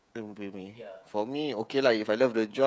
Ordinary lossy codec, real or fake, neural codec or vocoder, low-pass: none; fake; codec, 16 kHz, 16 kbps, FreqCodec, smaller model; none